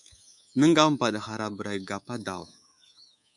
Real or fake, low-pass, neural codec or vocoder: fake; 10.8 kHz; codec, 24 kHz, 3.1 kbps, DualCodec